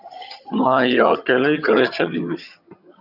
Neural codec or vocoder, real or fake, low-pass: vocoder, 22.05 kHz, 80 mel bands, HiFi-GAN; fake; 5.4 kHz